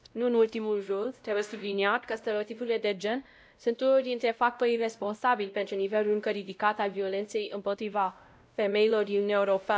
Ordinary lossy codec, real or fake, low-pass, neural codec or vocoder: none; fake; none; codec, 16 kHz, 0.5 kbps, X-Codec, WavLM features, trained on Multilingual LibriSpeech